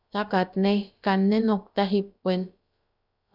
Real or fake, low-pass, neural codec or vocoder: fake; 5.4 kHz; codec, 16 kHz, about 1 kbps, DyCAST, with the encoder's durations